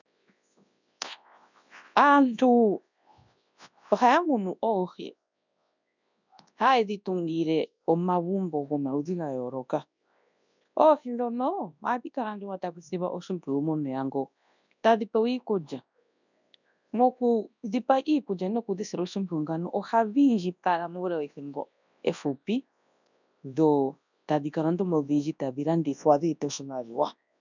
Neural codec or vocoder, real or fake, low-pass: codec, 24 kHz, 0.9 kbps, WavTokenizer, large speech release; fake; 7.2 kHz